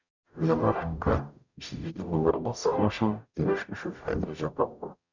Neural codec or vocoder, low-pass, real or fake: codec, 44.1 kHz, 0.9 kbps, DAC; 7.2 kHz; fake